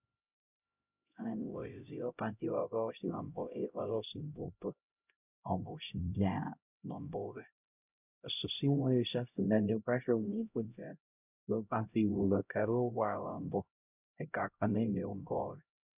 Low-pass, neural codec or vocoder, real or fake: 3.6 kHz; codec, 16 kHz, 0.5 kbps, X-Codec, HuBERT features, trained on LibriSpeech; fake